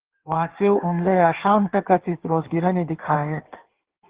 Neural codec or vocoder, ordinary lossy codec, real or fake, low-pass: codec, 16 kHz in and 24 kHz out, 1.1 kbps, FireRedTTS-2 codec; Opus, 16 kbps; fake; 3.6 kHz